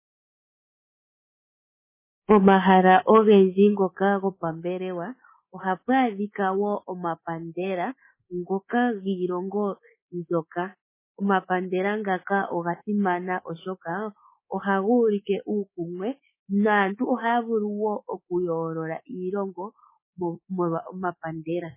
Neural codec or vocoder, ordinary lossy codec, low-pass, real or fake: codec, 24 kHz, 3.1 kbps, DualCodec; MP3, 16 kbps; 3.6 kHz; fake